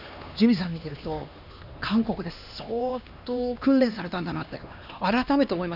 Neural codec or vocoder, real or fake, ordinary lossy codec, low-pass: codec, 16 kHz, 2 kbps, X-Codec, HuBERT features, trained on LibriSpeech; fake; none; 5.4 kHz